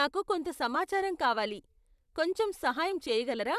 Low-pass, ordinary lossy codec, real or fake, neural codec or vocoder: 14.4 kHz; none; fake; vocoder, 44.1 kHz, 128 mel bands, Pupu-Vocoder